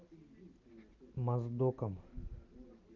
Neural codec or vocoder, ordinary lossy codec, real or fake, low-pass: none; Opus, 24 kbps; real; 7.2 kHz